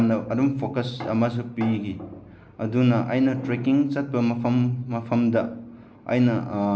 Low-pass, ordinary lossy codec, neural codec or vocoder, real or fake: none; none; none; real